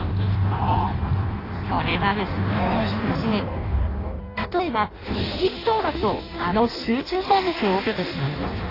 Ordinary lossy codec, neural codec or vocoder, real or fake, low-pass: AAC, 24 kbps; codec, 16 kHz in and 24 kHz out, 0.6 kbps, FireRedTTS-2 codec; fake; 5.4 kHz